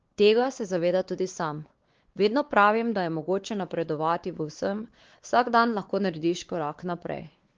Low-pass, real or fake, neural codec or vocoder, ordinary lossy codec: 7.2 kHz; fake; codec, 16 kHz, 4 kbps, X-Codec, WavLM features, trained on Multilingual LibriSpeech; Opus, 16 kbps